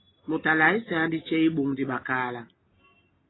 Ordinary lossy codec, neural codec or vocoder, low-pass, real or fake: AAC, 16 kbps; none; 7.2 kHz; real